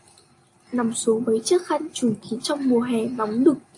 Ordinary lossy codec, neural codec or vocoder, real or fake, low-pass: AAC, 64 kbps; none; real; 10.8 kHz